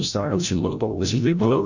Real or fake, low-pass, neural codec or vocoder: fake; 7.2 kHz; codec, 16 kHz, 0.5 kbps, FreqCodec, larger model